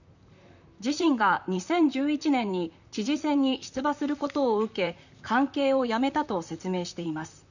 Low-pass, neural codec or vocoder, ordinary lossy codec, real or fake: 7.2 kHz; vocoder, 44.1 kHz, 128 mel bands, Pupu-Vocoder; none; fake